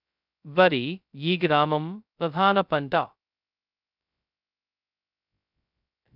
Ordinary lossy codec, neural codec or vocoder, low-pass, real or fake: none; codec, 16 kHz, 0.2 kbps, FocalCodec; 5.4 kHz; fake